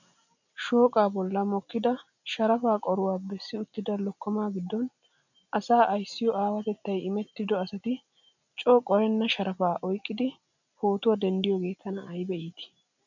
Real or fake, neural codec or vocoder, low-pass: real; none; 7.2 kHz